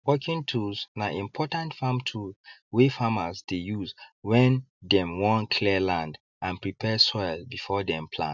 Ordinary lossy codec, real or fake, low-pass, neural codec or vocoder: none; real; 7.2 kHz; none